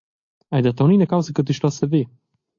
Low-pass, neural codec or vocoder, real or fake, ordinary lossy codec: 7.2 kHz; none; real; AAC, 48 kbps